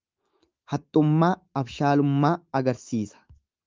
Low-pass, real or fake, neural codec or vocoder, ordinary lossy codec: 7.2 kHz; fake; autoencoder, 48 kHz, 128 numbers a frame, DAC-VAE, trained on Japanese speech; Opus, 24 kbps